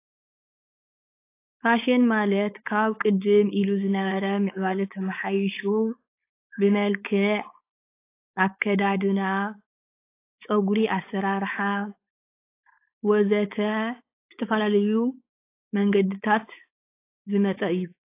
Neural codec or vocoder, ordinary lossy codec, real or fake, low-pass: codec, 16 kHz, 4.8 kbps, FACodec; AAC, 24 kbps; fake; 3.6 kHz